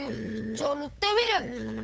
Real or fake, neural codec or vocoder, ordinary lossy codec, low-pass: fake; codec, 16 kHz, 8 kbps, FunCodec, trained on LibriTTS, 25 frames a second; none; none